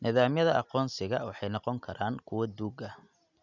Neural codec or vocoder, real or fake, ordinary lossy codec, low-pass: none; real; none; 7.2 kHz